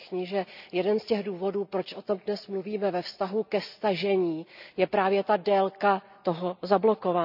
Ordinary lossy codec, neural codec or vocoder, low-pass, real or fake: none; none; 5.4 kHz; real